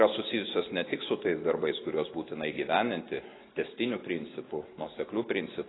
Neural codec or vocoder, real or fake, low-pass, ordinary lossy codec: none; real; 7.2 kHz; AAC, 16 kbps